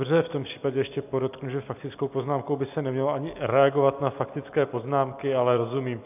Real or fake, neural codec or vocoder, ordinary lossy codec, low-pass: real; none; AAC, 32 kbps; 3.6 kHz